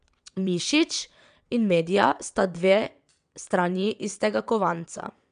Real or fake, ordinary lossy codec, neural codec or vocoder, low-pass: fake; none; vocoder, 22.05 kHz, 80 mel bands, WaveNeXt; 9.9 kHz